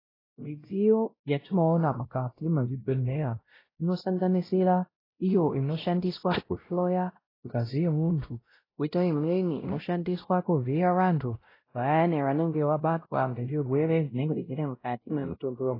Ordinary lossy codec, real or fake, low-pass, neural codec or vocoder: AAC, 24 kbps; fake; 5.4 kHz; codec, 16 kHz, 0.5 kbps, X-Codec, WavLM features, trained on Multilingual LibriSpeech